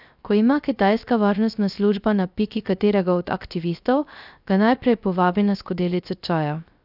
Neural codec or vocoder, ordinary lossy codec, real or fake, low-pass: codec, 16 kHz, 0.3 kbps, FocalCodec; none; fake; 5.4 kHz